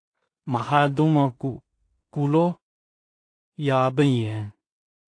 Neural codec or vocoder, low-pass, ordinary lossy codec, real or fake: codec, 16 kHz in and 24 kHz out, 0.4 kbps, LongCat-Audio-Codec, two codebook decoder; 9.9 kHz; AAC, 48 kbps; fake